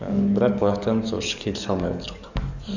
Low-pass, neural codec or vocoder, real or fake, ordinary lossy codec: 7.2 kHz; codec, 44.1 kHz, 7.8 kbps, DAC; fake; none